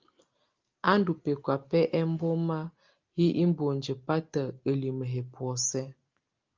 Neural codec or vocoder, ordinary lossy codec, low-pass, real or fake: none; Opus, 32 kbps; 7.2 kHz; real